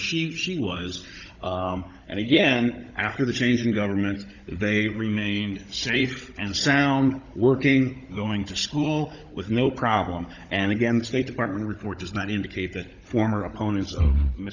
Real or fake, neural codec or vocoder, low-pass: fake; codec, 16 kHz, 16 kbps, FunCodec, trained on Chinese and English, 50 frames a second; 7.2 kHz